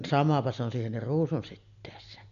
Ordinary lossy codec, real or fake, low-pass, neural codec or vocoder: none; real; 7.2 kHz; none